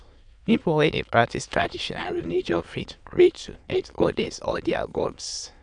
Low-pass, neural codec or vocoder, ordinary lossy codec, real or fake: 9.9 kHz; autoencoder, 22.05 kHz, a latent of 192 numbers a frame, VITS, trained on many speakers; none; fake